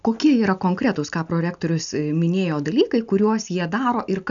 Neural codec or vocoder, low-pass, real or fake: none; 7.2 kHz; real